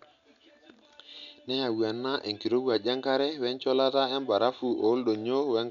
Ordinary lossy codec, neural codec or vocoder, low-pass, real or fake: none; none; 7.2 kHz; real